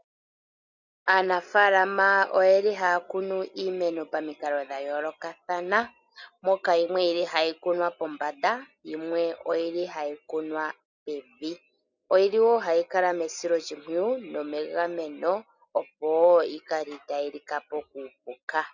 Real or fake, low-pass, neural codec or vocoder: real; 7.2 kHz; none